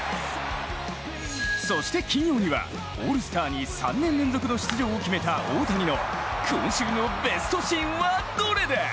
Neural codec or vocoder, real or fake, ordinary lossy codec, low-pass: none; real; none; none